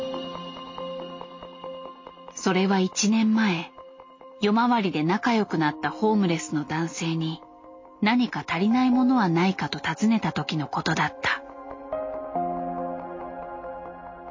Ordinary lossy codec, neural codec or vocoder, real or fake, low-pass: MP3, 32 kbps; none; real; 7.2 kHz